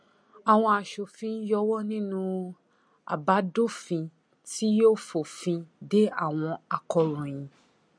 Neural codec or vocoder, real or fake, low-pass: none; real; 9.9 kHz